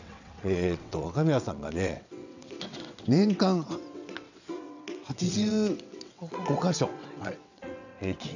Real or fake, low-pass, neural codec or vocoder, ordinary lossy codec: fake; 7.2 kHz; vocoder, 22.05 kHz, 80 mel bands, WaveNeXt; none